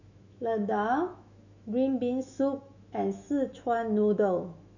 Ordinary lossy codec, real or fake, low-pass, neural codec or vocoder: none; fake; 7.2 kHz; autoencoder, 48 kHz, 128 numbers a frame, DAC-VAE, trained on Japanese speech